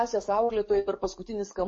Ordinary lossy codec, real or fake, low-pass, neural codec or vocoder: MP3, 32 kbps; fake; 10.8 kHz; codec, 44.1 kHz, 7.8 kbps, DAC